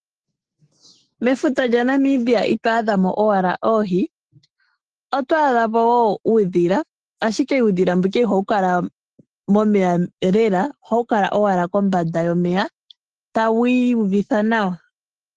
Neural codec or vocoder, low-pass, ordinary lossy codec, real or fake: autoencoder, 48 kHz, 128 numbers a frame, DAC-VAE, trained on Japanese speech; 10.8 kHz; Opus, 16 kbps; fake